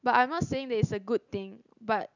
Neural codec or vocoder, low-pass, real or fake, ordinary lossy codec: none; 7.2 kHz; real; none